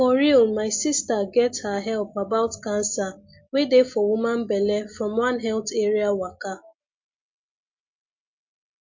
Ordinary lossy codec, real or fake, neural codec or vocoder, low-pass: MP3, 48 kbps; real; none; 7.2 kHz